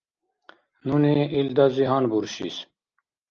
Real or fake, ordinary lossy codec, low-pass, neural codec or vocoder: real; Opus, 24 kbps; 7.2 kHz; none